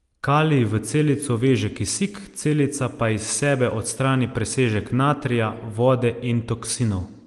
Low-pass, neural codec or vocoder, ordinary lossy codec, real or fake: 10.8 kHz; none; Opus, 24 kbps; real